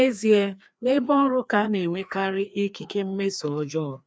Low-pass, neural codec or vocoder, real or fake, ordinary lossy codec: none; codec, 16 kHz, 2 kbps, FreqCodec, larger model; fake; none